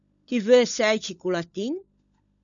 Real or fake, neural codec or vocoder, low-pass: fake; codec, 16 kHz, 16 kbps, FunCodec, trained on LibriTTS, 50 frames a second; 7.2 kHz